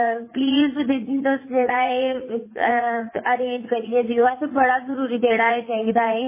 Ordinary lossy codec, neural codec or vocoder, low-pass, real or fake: MP3, 16 kbps; vocoder, 44.1 kHz, 80 mel bands, Vocos; 3.6 kHz; fake